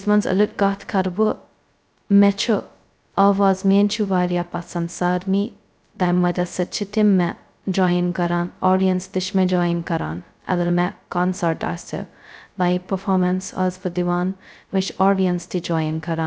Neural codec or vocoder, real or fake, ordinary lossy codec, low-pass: codec, 16 kHz, 0.2 kbps, FocalCodec; fake; none; none